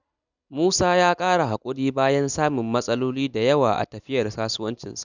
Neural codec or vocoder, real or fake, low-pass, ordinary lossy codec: none; real; 7.2 kHz; none